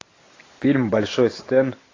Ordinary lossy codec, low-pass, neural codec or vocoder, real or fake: AAC, 32 kbps; 7.2 kHz; none; real